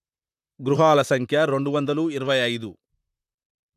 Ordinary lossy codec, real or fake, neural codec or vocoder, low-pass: none; fake; vocoder, 44.1 kHz, 128 mel bands, Pupu-Vocoder; 14.4 kHz